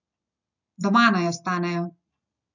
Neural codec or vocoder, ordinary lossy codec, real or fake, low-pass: none; none; real; 7.2 kHz